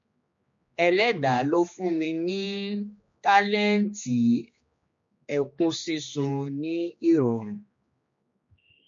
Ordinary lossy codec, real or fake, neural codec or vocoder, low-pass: MP3, 48 kbps; fake; codec, 16 kHz, 2 kbps, X-Codec, HuBERT features, trained on general audio; 7.2 kHz